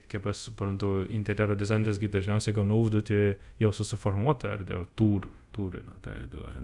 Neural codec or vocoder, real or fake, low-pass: codec, 24 kHz, 0.5 kbps, DualCodec; fake; 10.8 kHz